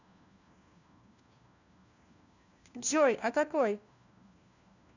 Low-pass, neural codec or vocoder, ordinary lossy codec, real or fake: 7.2 kHz; codec, 16 kHz, 1 kbps, FunCodec, trained on LibriTTS, 50 frames a second; none; fake